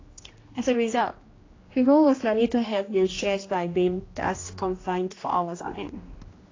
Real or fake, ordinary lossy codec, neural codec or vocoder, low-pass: fake; AAC, 32 kbps; codec, 16 kHz, 1 kbps, X-Codec, HuBERT features, trained on general audio; 7.2 kHz